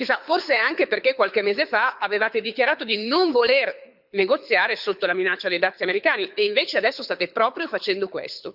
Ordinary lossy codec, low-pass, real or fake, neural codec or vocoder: Opus, 64 kbps; 5.4 kHz; fake; codec, 24 kHz, 6 kbps, HILCodec